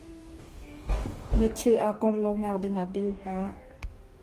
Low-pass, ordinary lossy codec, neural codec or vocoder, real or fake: 14.4 kHz; Opus, 24 kbps; codec, 44.1 kHz, 2.6 kbps, DAC; fake